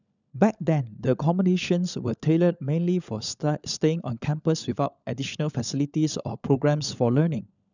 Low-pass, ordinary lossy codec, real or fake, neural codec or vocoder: 7.2 kHz; none; fake; codec, 16 kHz, 16 kbps, FunCodec, trained on LibriTTS, 50 frames a second